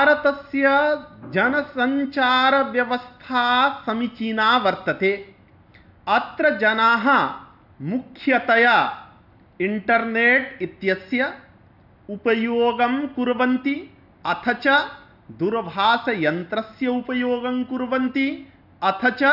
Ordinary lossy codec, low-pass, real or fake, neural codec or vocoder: none; 5.4 kHz; real; none